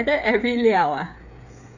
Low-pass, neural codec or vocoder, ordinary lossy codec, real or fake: 7.2 kHz; codec, 16 kHz, 8 kbps, FreqCodec, smaller model; none; fake